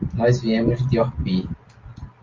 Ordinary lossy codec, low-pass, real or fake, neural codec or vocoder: Opus, 32 kbps; 7.2 kHz; real; none